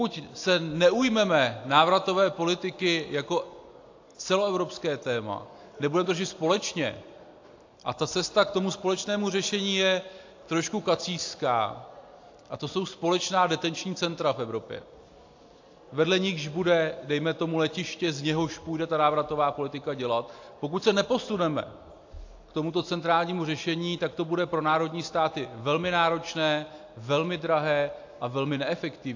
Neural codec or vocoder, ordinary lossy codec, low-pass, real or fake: none; AAC, 48 kbps; 7.2 kHz; real